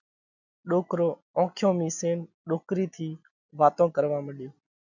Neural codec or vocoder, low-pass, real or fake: none; 7.2 kHz; real